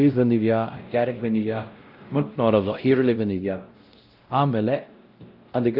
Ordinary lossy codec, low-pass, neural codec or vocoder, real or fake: Opus, 32 kbps; 5.4 kHz; codec, 16 kHz, 0.5 kbps, X-Codec, WavLM features, trained on Multilingual LibriSpeech; fake